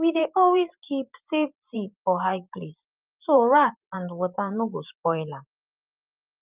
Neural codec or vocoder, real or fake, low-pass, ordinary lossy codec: vocoder, 44.1 kHz, 128 mel bands every 512 samples, BigVGAN v2; fake; 3.6 kHz; Opus, 24 kbps